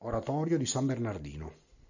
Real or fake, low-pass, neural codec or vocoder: real; 7.2 kHz; none